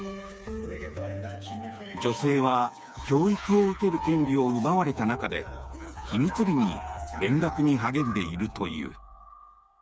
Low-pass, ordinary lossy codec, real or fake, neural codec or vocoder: none; none; fake; codec, 16 kHz, 4 kbps, FreqCodec, smaller model